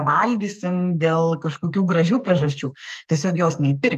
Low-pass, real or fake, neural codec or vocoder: 14.4 kHz; fake; codec, 44.1 kHz, 2.6 kbps, SNAC